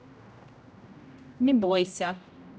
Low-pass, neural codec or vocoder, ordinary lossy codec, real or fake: none; codec, 16 kHz, 0.5 kbps, X-Codec, HuBERT features, trained on general audio; none; fake